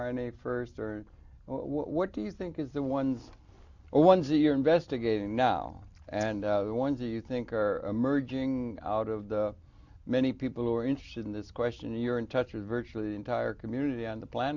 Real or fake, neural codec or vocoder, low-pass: real; none; 7.2 kHz